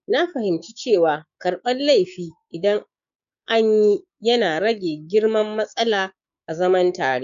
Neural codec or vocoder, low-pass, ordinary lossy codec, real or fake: codec, 16 kHz, 6 kbps, DAC; 7.2 kHz; none; fake